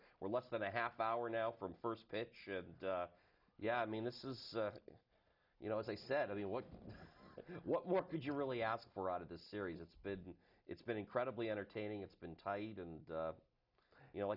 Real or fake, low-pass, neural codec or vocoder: real; 5.4 kHz; none